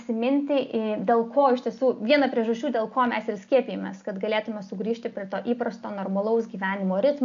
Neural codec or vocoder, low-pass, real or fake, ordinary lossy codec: none; 7.2 kHz; real; AAC, 64 kbps